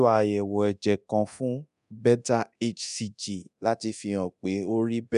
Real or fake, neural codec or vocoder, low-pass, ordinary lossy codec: fake; codec, 24 kHz, 0.9 kbps, DualCodec; 10.8 kHz; none